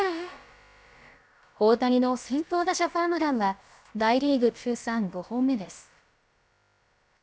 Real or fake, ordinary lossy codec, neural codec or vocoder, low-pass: fake; none; codec, 16 kHz, about 1 kbps, DyCAST, with the encoder's durations; none